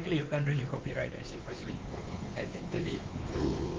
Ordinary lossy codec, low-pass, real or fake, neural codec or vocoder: Opus, 32 kbps; 7.2 kHz; fake; codec, 16 kHz, 2 kbps, X-Codec, WavLM features, trained on Multilingual LibriSpeech